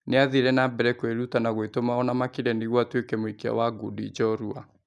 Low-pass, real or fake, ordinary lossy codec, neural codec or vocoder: none; real; none; none